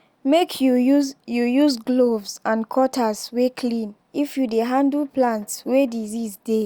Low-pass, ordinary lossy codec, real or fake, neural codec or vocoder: 19.8 kHz; Opus, 64 kbps; real; none